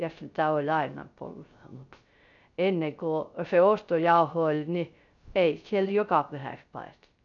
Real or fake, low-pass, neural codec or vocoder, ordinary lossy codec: fake; 7.2 kHz; codec, 16 kHz, 0.3 kbps, FocalCodec; none